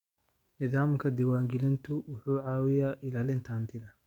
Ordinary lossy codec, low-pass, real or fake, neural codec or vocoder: MP3, 96 kbps; 19.8 kHz; fake; codec, 44.1 kHz, 7.8 kbps, DAC